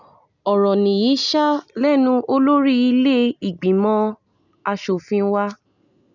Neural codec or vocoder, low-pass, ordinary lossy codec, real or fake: none; 7.2 kHz; none; real